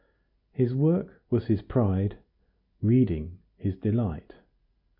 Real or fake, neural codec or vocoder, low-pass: real; none; 5.4 kHz